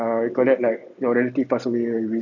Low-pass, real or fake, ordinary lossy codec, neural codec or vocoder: 7.2 kHz; real; none; none